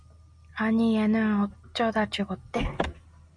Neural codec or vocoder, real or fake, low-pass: none; real; 9.9 kHz